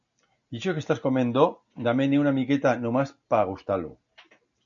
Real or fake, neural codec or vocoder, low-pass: real; none; 7.2 kHz